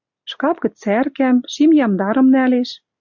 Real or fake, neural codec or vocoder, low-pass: real; none; 7.2 kHz